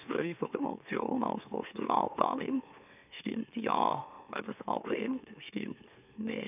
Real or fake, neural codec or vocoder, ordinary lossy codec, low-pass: fake; autoencoder, 44.1 kHz, a latent of 192 numbers a frame, MeloTTS; none; 3.6 kHz